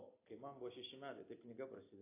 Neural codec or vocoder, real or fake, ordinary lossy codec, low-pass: none; real; AAC, 24 kbps; 3.6 kHz